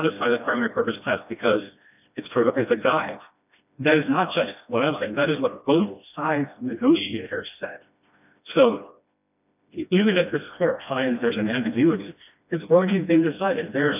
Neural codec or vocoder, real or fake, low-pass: codec, 16 kHz, 1 kbps, FreqCodec, smaller model; fake; 3.6 kHz